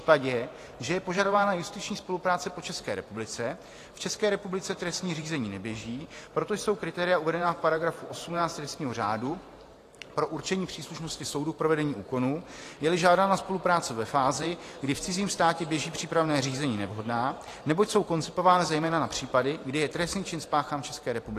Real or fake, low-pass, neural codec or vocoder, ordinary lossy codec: fake; 14.4 kHz; vocoder, 44.1 kHz, 128 mel bands every 512 samples, BigVGAN v2; AAC, 48 kbps